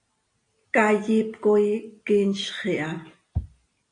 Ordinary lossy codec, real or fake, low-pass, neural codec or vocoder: AAC, 48 kbps; real; 9.9 kHz; none